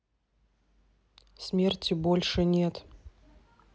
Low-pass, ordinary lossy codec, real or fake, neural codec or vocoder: none; none; real; none